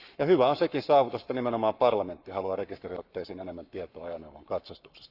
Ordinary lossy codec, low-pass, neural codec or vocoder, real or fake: none; 5.4 kHz; codec, 44.1 kHz, 7.8 kbps, Pupu-Codec; fake